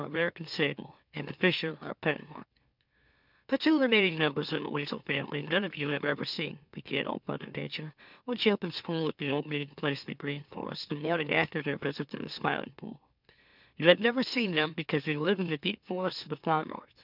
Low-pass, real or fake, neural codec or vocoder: 5.4 kHz; fake; autoencoder, 44.1 kHz, a latent of 192 numbers a frame, MeloTTS